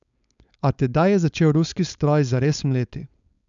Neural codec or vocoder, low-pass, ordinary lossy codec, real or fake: codec, 16 kHz, 4.8 kbps, FACodec; 7.2 kHz; MP3, 96 kbps; fake